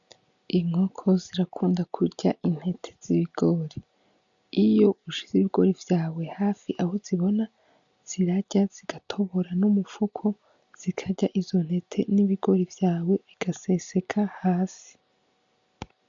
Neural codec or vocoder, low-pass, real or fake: none; 7.2 kHz; real